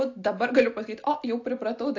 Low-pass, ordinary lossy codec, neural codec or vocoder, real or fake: 7.2 kHz; AAC, 48 kbps; none; real